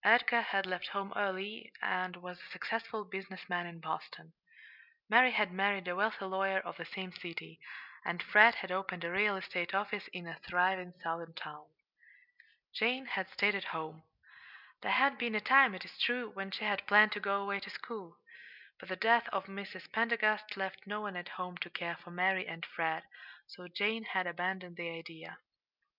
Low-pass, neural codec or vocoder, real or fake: 5.4 kHz; none; real